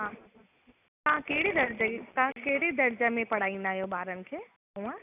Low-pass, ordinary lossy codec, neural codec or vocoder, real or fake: 3.6 kHz; none; none; real